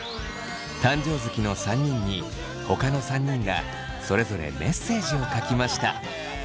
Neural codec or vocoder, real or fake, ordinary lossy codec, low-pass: none; real; none; none